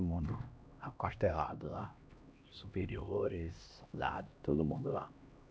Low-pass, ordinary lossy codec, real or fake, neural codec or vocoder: none; none; fake; codec, 16 kHz, 1 kbps, X-Codec, HuBERT features, trained on LibriSpeech